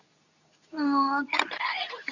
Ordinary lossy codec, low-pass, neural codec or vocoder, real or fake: none; 7.2 kHz; codec, 24 kHz, 0.9 kbps, WavTokenizer, medium speech release version 2; fake